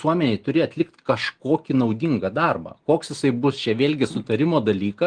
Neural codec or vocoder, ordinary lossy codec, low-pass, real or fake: none; Opus, 24 kbps; 9.9 kHz; real